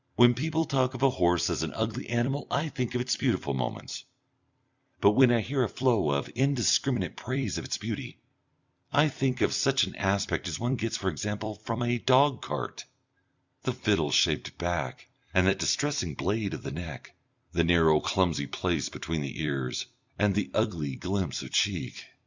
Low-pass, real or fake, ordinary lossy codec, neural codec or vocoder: 7.2 kHz; fake; Opus, 64 kbps; vocoder, 44.1 kHz, 128 mel bands every 256 samples, BigVGAN v2